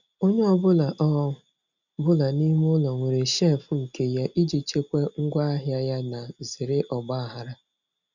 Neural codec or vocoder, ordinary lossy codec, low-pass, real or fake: none; none; 7.2 kHz; real